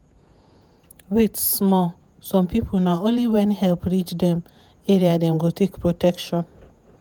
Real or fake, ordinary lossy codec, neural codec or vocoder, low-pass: fake; none; vocoder, 48 kHz, 128 mel bands, Vocos; none